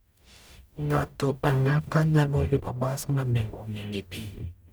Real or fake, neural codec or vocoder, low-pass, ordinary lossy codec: fake; codec, 44.1 kHz, 0.9 kbps, DAC; none; none